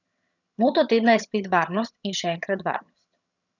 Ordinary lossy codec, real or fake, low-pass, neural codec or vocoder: none; fake; 7.2 kHz; vocoder, 22.05 kHz, 80 mel bands, HiFi-GAN